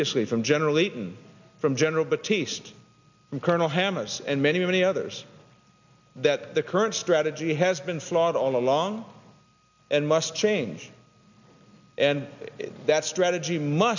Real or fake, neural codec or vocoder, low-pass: real; none; 7.2 kHz